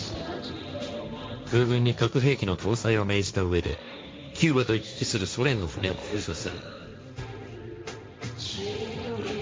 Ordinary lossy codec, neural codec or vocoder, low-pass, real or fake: none; codec, 16 kHz, 1.1 kbps, Voila-Tokenizer; none; fake